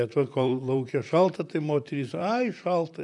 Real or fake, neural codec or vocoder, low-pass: fake; vocoder, 44.1 kHz, 128 mel bands, Pupu-Vocoder; 10.8 kHz